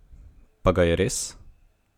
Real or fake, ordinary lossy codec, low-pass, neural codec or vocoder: real; none; 19.8 kHz; none